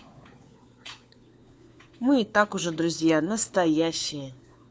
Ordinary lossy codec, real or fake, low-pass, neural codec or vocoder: none; fake; none; codec, 16 kHz, 4 kbps, FunCodec, trained on LibriTTS, 50 frames a second